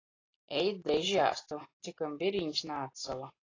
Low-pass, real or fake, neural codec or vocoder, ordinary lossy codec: 7.2 kHz; real; none; AAC, 32 kbps